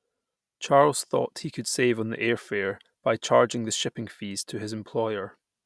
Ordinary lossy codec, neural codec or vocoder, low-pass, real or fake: none; none; none; real